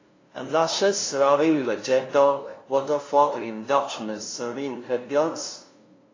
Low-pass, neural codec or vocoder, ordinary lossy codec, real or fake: 7.2 kHz; codec, 16 kHz, 0.5 kbps, FunCodec, trained on LibriTTS, 25 frames a second; AAC, 32 kbps; fake